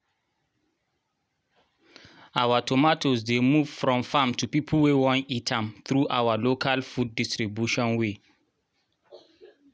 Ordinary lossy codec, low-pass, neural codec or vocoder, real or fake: none; none; none; real